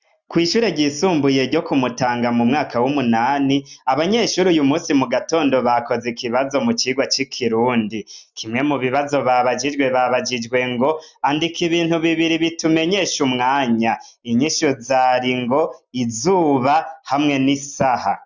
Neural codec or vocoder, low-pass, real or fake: none; 7.2 kHz; real